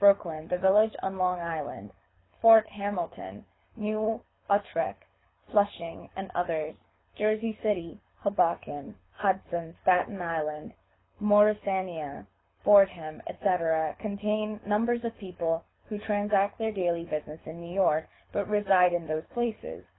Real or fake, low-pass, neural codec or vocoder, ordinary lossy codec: fake; 7.2 kHz; codec, 24 kHz, 6 kbps, HILCodec; AAC, 16 kbps